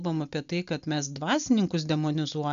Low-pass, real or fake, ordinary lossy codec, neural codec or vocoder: 7.2 kHz; real; AAC, 96 kbps; none